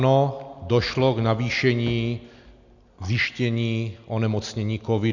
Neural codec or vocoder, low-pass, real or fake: none; 7.2 kHz; real